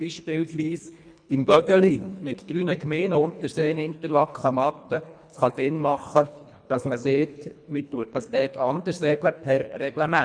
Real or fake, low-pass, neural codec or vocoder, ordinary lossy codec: fake; 9.9 kHz; codec, 24 kHz, 1.5 kbps, HILCodec; none